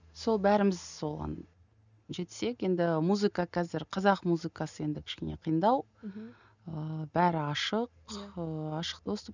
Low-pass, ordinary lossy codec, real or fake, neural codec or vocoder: 7.2 kHz; none; real; none